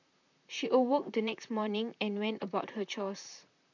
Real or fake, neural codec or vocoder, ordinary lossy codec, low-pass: fake; vocoder, 44.1 kHz, 128 mel bands, Pupu-Vocoder; none; 7.2 kHz